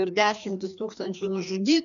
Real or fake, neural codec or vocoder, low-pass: fake; codec, 16 kHz, 2 kbps, FreqCodec, larger model; 7.2 kHz